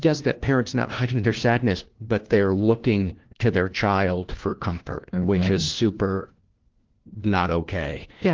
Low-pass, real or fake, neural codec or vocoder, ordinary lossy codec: 7.2 kHz; fake; codec, 16 kHz, 1 kbps, FunCodec, trained on LibriTTS, 50 frames a second; Opus, 16 kbps